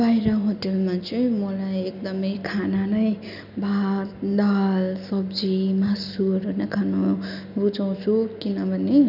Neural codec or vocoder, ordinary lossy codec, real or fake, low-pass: none; none; real; 5.4 kHz